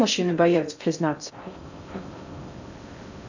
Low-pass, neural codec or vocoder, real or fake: 7.2 kHz; codec, 16 kHz in and 24 kHz out, 0.6 kbps, FocalCodec, streaming, 4096 codes; fake